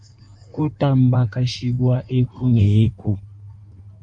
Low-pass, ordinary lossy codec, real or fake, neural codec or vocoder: 9.9 kHz; AAC, 64 kbps; fake; codec, 16 kHz in and 24 kHz out, 1.1 kbps, FireRedTTS-2 codec